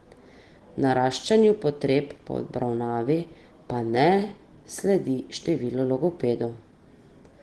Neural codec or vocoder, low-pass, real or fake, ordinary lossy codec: none; 10.8 kHz; real; Opus, 16 kbps